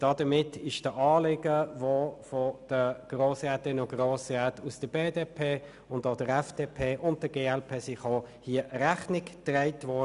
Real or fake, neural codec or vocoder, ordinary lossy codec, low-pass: real; none; none; 10.8 kHz